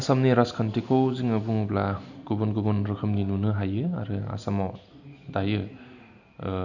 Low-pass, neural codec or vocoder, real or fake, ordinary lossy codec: 7.2 kHz; none; real; none